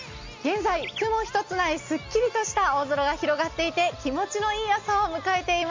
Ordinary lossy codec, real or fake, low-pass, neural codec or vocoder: MP3, 48 kbps; real; 7.2 kHz; none